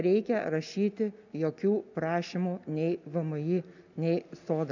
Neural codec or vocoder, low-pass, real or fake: none; 7.2 kHz; real